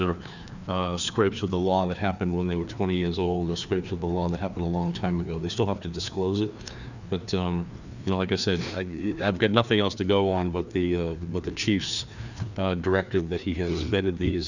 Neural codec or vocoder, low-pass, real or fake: codec, 16 kHz, 2 kbps, FreqCodec, larger model; 7.2 kHz; fake